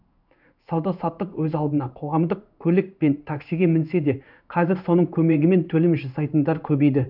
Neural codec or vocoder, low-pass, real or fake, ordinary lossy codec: codec, 16 kHz in and 24 kHz out, 1 kbps, XY-Tokenizer; 5.4 kHz; fake; none